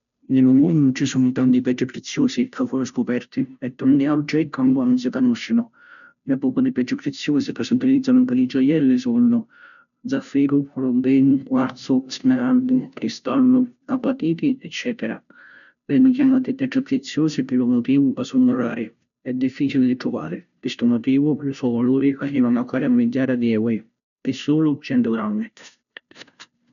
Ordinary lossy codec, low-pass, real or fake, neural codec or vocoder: none; 7.2 kHz; fake; codec, 16 kHz, 0.5 kbps, FunCodec, trained on Chinese and English, 25 frames a second